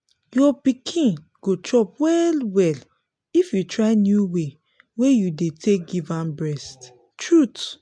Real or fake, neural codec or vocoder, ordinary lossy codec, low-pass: real; none; MP3, 64 kbps; 9.9 kHz